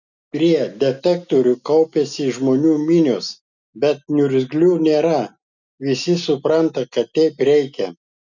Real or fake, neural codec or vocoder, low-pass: real; none; 7.2 kHz